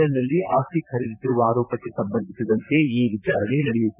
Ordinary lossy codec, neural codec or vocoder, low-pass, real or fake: none; vocoder, 44.1 kHz, 128 mel bands, Pupu-Vocoder; 3.6 kHz; fake